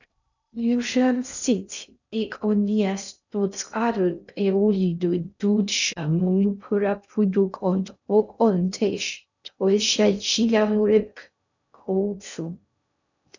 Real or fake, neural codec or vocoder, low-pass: fake; codec, 16 kHz in and 24 kHz out, 0.6 kbps, FocalCodec, streaming, 2048 codes; 7.2 kHz